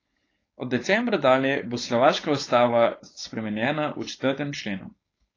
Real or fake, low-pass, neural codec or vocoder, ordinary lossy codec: fake; 7.2 kHz; codec, 16 kHz, 4.8 kbps, FACodec; AAC, 32 kbps